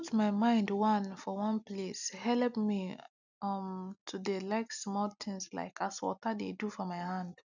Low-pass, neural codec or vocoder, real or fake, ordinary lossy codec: 7.2 kHz; none; real; none